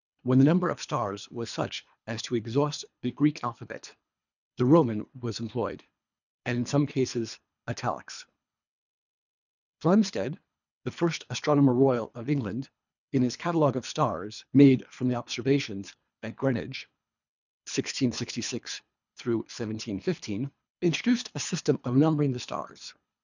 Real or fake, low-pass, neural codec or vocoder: fake; 7.2 kHz; codec, 24 kHz, 3 kbps, HILCodec